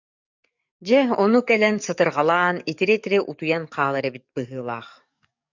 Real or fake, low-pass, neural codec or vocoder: fake; 7.2 kHz; codec, 44.1 kHz, 7.8 kbps, DAC